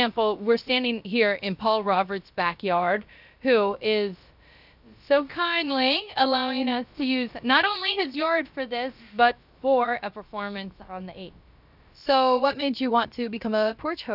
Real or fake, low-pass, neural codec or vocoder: fake; 5.4 kHz; codec, 16 kHz, about 1 kbps, DyCAST, with the encoder's durations